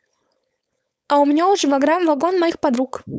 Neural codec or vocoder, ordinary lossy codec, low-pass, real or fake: codec, 16 kHz, 4.8 kbps, FACodec; none; none; fake